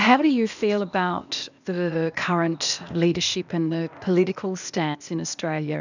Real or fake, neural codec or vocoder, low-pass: fake; codec, 16 kHz, 0.8 kbps, ZipCodec; 7.2 kHz